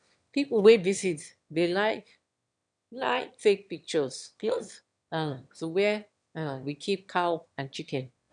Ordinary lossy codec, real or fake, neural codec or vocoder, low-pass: none; fake; autoencoder, 22.05 kHz, a latent of 192 numbers a frame, VITS, trained on one speaker; 9.9 kHz